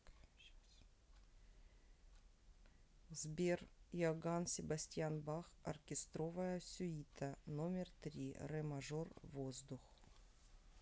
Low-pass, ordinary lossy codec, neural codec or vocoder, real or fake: none; none; none; real